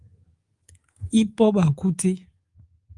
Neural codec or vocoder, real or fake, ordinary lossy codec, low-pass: codec, 24 kHz, 3.1 kbps, DualCodec; fake; Opus, 24 kbps; 10.8 kHz